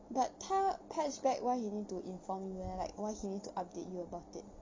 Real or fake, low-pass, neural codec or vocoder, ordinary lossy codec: real; 7.2 kHz; none; MP3, 64 kbps